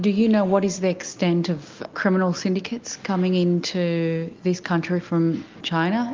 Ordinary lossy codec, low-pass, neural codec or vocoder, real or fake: Opus, 32 kbps; 7.2 kHz; none; real